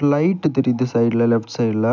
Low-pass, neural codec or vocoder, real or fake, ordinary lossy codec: 7.2 kHz; none; real; none